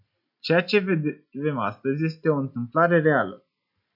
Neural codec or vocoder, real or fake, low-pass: none; real; 5.4 kHz